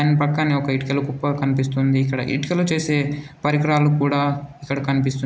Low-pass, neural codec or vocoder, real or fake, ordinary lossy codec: none; none; real; none